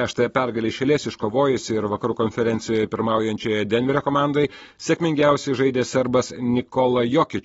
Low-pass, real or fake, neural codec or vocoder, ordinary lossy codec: 9.9 kHz; real; none; AAC, 24 kbps